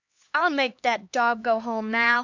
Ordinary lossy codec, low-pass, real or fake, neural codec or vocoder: MP3, 64 kbps; 7.2 kHz; fake; codec, 16 kHz, 2 kbps, X-Codec, HuBERT features, trained on LibriSpeech